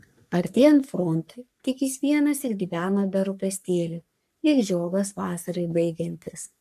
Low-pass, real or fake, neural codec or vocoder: 14.4 kHz; fake; codec, 44.1 kHz, 3.4 kbps, Pupu-Codec